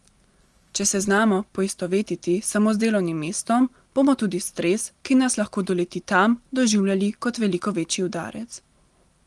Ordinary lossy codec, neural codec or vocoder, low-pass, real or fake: Opus, 24 kbps; none; 10.8 kHz; real